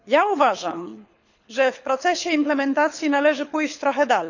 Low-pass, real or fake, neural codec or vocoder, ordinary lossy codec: 7.2 kHz; fake; codec, 24 kHz, 6 kbps, HILCodec; none